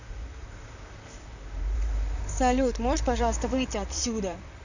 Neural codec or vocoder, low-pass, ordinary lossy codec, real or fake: vocoder, 44.1 kHz, 128 mel bands, Pupu-Vocoder; 7.2 kHz; none; fake